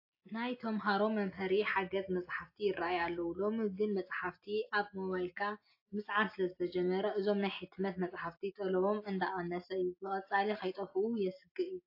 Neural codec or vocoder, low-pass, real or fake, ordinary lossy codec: none; 5.4 kHz; real; AAC, 32 kbps